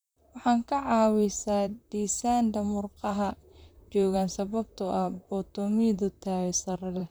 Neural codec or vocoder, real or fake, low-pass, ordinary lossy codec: vocoder, 44.1 kHz, 128 mel bands, Pupu-Vocoder; fake; none; none